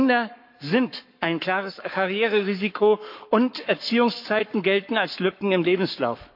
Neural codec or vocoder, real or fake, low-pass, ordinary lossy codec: codec, 44.1 kHz, 7.8 kbps, Pupu-Codec; fake; 5.4 kHz; MP3, 48 kbps